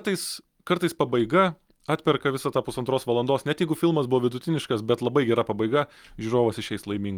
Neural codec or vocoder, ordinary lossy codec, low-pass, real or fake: none; Opus, 32 kbps; 19.8 kHz; real